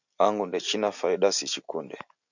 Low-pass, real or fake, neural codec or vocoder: 7.2 kHz; real; none